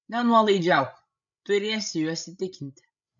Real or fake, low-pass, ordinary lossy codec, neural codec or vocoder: fake; 7.2 kHz; MP3, 64 kbps; codec, 16 kHz, 16 kbps, FreqCodec, larger model